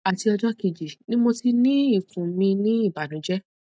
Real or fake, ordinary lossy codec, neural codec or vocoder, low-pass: real; none; none; none